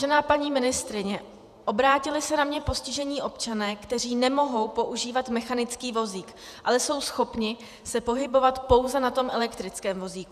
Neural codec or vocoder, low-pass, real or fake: vocoder, 48 kHz, 128 mel bands, Vocos; 14.4 kHz; fake